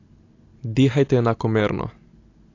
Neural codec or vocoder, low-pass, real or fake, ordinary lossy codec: none; 7.2 kHz; real; AAC, 48 kbps